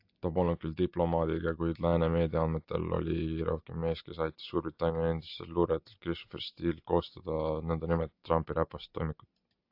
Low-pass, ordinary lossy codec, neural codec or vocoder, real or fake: 5.4 kHz; AAC, 48 kbps; none; real